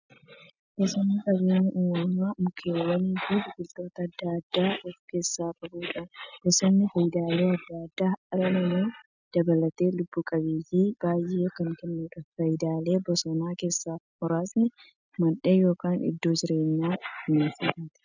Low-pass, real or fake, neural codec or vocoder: 7.2 kHz; real; none